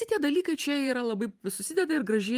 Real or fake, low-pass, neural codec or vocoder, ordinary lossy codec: fake; 14.4 kHz; vocoder, 44.1 kHz, 128 mel bands every 512 samples, BigVGAN v2; Opus, 32 kbps